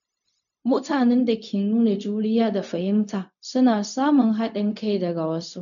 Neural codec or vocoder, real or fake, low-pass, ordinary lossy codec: codec, 16 kHz, 0.4 kbps, LongCat-Audio-Codec; fake; 7.2 kHz; none